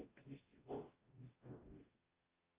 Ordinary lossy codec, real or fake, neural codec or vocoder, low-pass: Opus, 24 kbps; fake; codec, 44.1 kHz, 0.9 kbps, DAC; 3.6 kHz